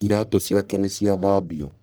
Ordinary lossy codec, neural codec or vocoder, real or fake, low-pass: none; codec, 44.1 kHz, 1.7 kbps, Pupu-Codec; fake; none